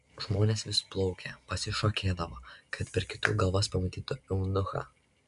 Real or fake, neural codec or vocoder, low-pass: real; none; 10.8 kHz